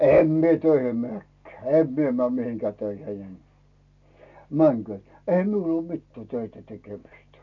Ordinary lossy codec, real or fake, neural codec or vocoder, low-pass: none; real; none; 7.2 kHz